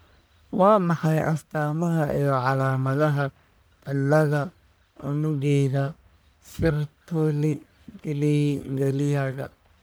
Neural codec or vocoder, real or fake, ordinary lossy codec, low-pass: codec, 44.1 kHz, 1.7 kbps, Pupu-Codec; fake; none; none